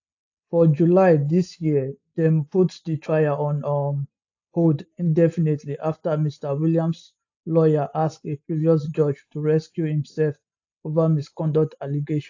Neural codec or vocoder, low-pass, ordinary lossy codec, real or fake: none; 7.2 kHz; AAC, 48 kbps; real